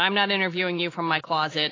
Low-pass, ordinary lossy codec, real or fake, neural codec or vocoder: 7.2 kHz; AAC, 32 kbps; real; none